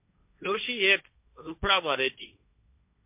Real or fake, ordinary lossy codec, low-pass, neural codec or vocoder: fake; MP3, 32 kbps; 3.6 kHz; codec, 16 kHz, 1.1 kbps, Voila-Tokenizer